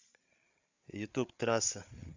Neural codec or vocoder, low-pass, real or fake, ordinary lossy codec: codec, 16 kHz, 8 kbps, FreqCodec, larger model; 7.2 kHz; fake; MP3, 64 kbps